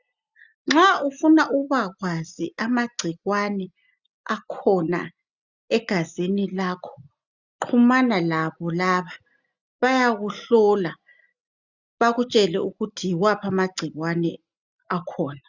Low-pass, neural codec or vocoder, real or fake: 7.2 kHz; none; real